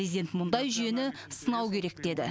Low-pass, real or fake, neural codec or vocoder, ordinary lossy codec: none; real; none; none